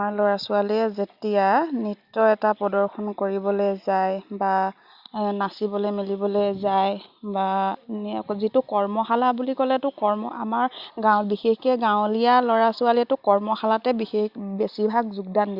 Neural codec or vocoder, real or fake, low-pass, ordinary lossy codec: none; real; 5.4 kHz; Opus, 64 kbps